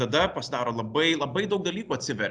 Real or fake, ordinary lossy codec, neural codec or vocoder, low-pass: real; Opus, 64 kbps; none; 9.9 kHz